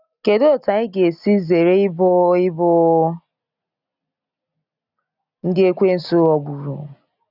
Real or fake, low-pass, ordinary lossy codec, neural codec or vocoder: real; 5.4 kHz; none; none